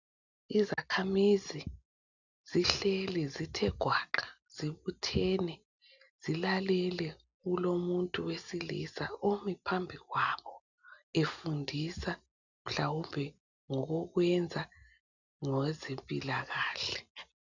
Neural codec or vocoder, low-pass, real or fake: none; 7.2 kHz; real